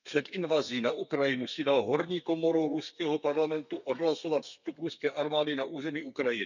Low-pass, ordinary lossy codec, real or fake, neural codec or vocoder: 7.2 kHz; none; fake; codec, 32 kHz, 1.9 kbps, SNAC